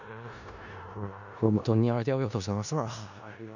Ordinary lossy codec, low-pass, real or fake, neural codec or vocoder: none; 7.2 kHz; fake; codec, 16 kHz in and 24 kHz out, 0.4 kbps, LongCat-Audio-Codec, four codebook decoder